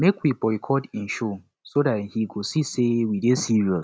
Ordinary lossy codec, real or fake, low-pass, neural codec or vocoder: none; real; none; none